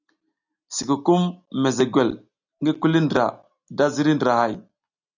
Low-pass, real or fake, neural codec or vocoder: 7.2 kHz; real; none